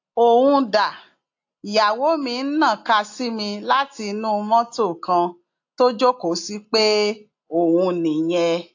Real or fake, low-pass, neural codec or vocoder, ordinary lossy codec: real; 7.2 kHz; none; AAC, 48 kbps